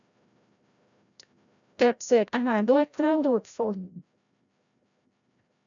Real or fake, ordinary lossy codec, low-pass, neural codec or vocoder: fake; none; 7.2 kHz; codec, 16 kHz, 0.5 kbps, FreqCodec, larger model